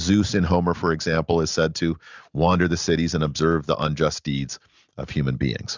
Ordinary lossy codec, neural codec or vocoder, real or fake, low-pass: Opus, 64 kbps; none; real; 7.2 kHz